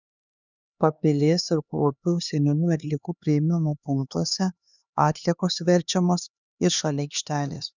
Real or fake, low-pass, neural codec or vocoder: fake; 7.2 kHz; codec, 16 kHz, 2 kbps, X-Codec, HuBERT features, trained on LibriSpeech